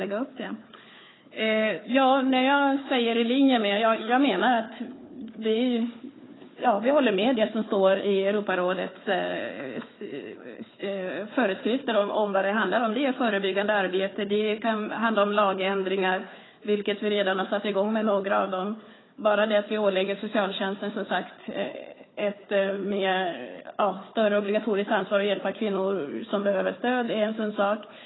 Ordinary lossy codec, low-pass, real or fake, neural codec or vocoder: AAC, 16 kbps; 7.2 kHz; fake; codec, 16 kHz, 4 kbps, FunCodec, trained on Chinese and English, 50 frames a second